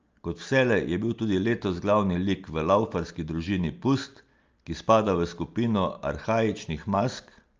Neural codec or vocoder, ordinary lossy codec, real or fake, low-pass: none; Opus, 24 kbps; real; 7.2 kHz